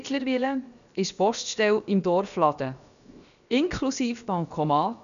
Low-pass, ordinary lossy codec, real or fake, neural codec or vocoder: 7.2 kHz; none; fake; codec, 16 kHz, 0.7 kbps, FocalCodec